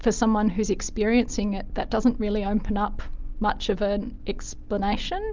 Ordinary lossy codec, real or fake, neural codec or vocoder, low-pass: Opus, 24 kbps; real; none; 7.2 kHz